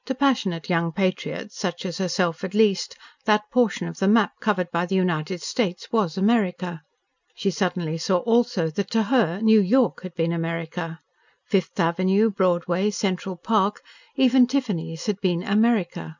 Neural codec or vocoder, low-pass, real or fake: none; 7.2 kHz; real